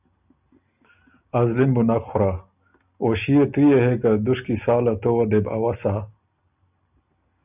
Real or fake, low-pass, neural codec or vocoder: real; 3.6 kHz; none